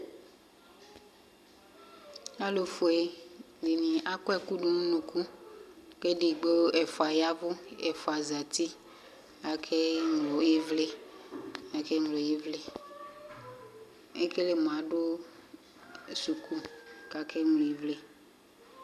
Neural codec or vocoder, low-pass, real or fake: none; 14.4 kHz; real